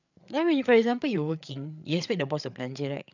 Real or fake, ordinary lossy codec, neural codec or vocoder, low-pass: fake; none; codec, 16 kHz, 8 kbps, FreqCodec, larger model; 7.2 kHz